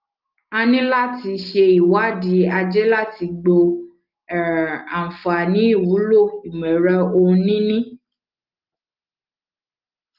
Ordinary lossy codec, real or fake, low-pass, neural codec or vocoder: Opus, 32 kbps; real; 5.4 kHz; none